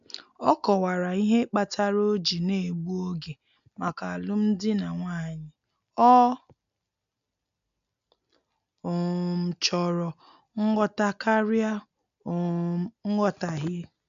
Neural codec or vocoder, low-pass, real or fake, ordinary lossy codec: none; 7.2 kHz; real; AAC, 96 kbps